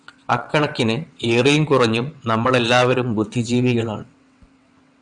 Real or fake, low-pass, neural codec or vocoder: fake; 9.9 kHz; vocoder, 22.05 kHz, 80 mel bands, WaveNeXt